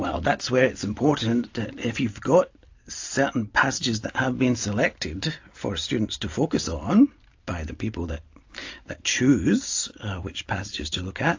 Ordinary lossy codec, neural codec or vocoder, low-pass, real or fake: AAC, 48 kbps; none; 7.2 kHz; real